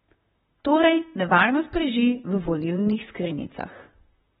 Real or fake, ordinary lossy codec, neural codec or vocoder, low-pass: fake; AAC, 16 kbps; vocoder, 44.1 kHz, 128 mel bands, Pupu-Vocoder; 19.8 kHz